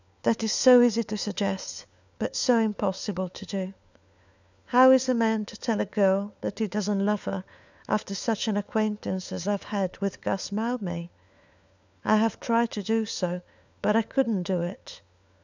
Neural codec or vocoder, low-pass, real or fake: codec, 16 kHz, 4 kbps, FunCodec, trained on LibriTTS, 50 frames a second; 7.2 kHz; fake